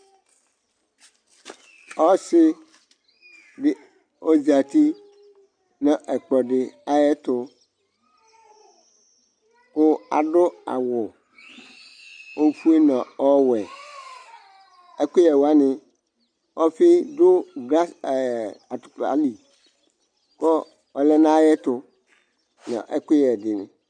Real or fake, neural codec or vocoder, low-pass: real; none; 9.9 kHz